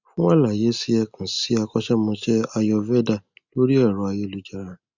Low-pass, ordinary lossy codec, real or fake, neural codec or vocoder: 7.2 kHz; Opus, 64 kbps; real; none